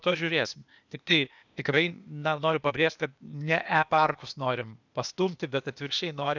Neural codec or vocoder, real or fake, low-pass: codec, 16 kHz, 0.8 kbps, ZipCodec; fake; 7.2 kHz